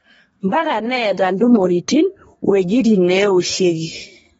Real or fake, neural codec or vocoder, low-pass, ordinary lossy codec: fake; codec, 32 kHz, 1.9 kbps, SNAC; 14.4 kHz; AAC, 24 kbps